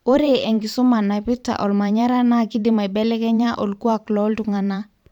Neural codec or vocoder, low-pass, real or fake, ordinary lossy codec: vocoder, 44.1 kHz, 128 mel bands every 512 samples, BigVGAN v2; 19.8 kHz; fake; none